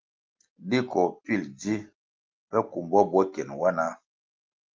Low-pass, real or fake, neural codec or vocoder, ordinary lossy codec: 7.2 kHz; real; none; Opus, 24 kbps